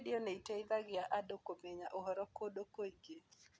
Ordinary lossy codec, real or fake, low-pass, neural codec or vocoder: none; real; none; none